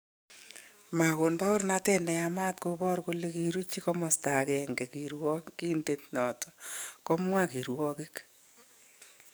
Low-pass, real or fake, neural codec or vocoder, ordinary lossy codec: none; fake; codec, 44.1 kHz, 7.8 kbps, DAC; none